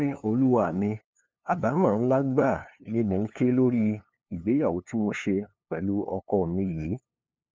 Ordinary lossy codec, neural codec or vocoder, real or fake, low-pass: none; codec, 16 kHz, 2 kbps, FunCodec, trained on LibriTTS, 25 frames a second; fake; none